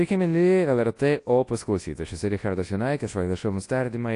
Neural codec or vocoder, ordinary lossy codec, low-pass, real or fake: codec, 24 kHz, 0.9 kbps, WavTokenizer, large speech release; AAC, 48 kbps; 10.8 kHz; fake